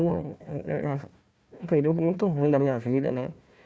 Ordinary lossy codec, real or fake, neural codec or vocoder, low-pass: none; fake; codec, 16 kHz, 1 kbps, FunCodec, trained on Chinese and English, 50 frames a second; none